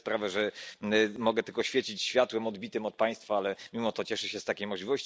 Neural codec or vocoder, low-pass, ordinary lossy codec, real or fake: none; none; none; real